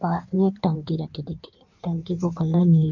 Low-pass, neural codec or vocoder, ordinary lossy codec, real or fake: 7.2 kHz; codec, 16 kHz in and 24 kHz out, 1.1 kbps, FireRedTTS-2 codec; none; fake